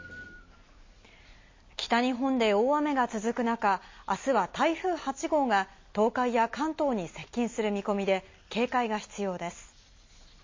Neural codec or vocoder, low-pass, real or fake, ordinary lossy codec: none; 7.2 kHz; real; MP3, 32 kbps